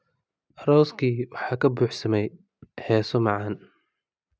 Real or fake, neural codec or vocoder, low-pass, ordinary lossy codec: real; none; none; none